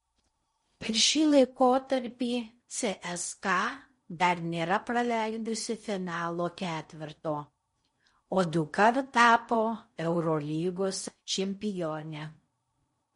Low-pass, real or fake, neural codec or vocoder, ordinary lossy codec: 10.8 kHz; fake; codec, 16 kHz in and 24 kHz out, 0.6 kbps, FocalCodec, streaming, 2048 codes; MP3, 48 kbps